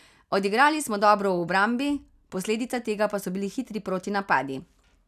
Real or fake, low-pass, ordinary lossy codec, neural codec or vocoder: real; 14.4 kHz; none; none